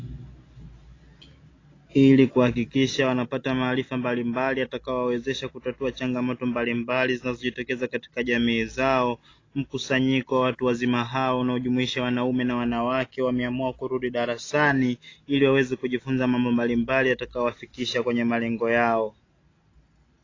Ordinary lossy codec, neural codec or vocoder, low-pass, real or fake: AAC, 32 kbps; none; 7.2 kHz; real